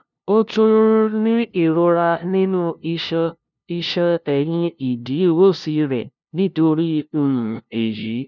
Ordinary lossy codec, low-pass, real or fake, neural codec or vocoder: none; 7.2 kHz; fake; codec, 16 kHz, 0.5 kbps, FunCodec, trained on LibriTTS, 25 frames a second